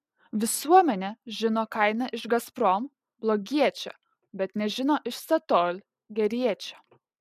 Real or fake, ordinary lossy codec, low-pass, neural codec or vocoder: real; MP3, 96 kbps; 14.4 kHz; none